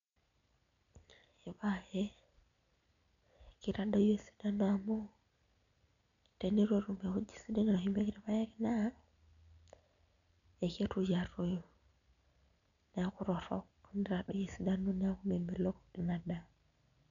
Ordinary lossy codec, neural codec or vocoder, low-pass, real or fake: none; none; 7.2 kHz; real